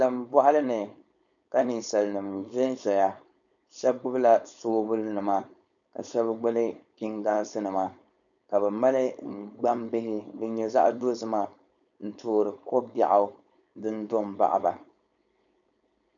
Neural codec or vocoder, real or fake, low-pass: codec, 16 kHz, 4.8 kbps, FACodec; fake; 7.2 kHz